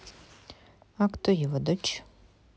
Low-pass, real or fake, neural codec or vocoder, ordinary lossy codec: none; real; none; none